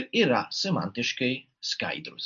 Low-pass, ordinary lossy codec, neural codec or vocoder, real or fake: 7.2 kHz; MP3, 48 kbps; none; real